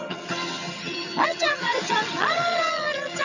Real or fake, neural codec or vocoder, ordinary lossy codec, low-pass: fake; vocoder, 22.05 kHz, 80 mel bands, HiFi-GAN; none; 7.2 kHz